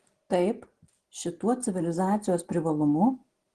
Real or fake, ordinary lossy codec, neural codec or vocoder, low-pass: real; Opus, 16 kbps; none; 10.8 kHz